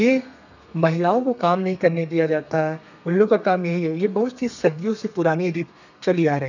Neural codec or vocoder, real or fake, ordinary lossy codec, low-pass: codec, 32 kHz, 1.9 kbps, SNAC; fake; none; 7.2 kHz